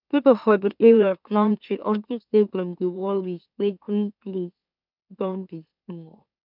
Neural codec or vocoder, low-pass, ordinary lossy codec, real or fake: autoencoder, 44.1 kHz, a latent of 192 numbers a frame, MeloTTS; 5.4 kHz; none; fake